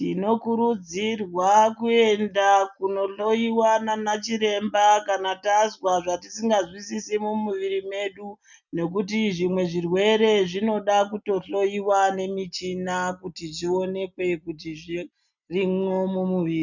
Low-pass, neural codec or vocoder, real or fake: 7.2 kHz; none; real